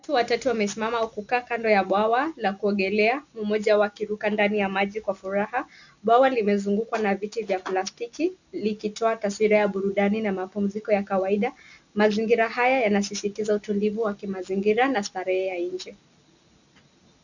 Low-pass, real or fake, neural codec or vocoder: 7.2 kHz; real; none